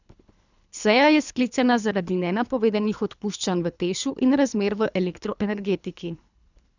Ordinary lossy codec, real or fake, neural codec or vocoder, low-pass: none; fake; codec, 24 kHz, 3 kbps, HILCodec; 7.2 kHz